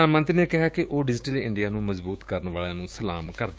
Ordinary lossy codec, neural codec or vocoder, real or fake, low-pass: none; codec, 16 kHz, 6 kbps, DAC; fake; none